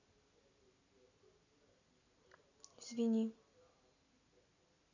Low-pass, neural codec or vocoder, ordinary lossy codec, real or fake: 7.2 kHz; none; none; real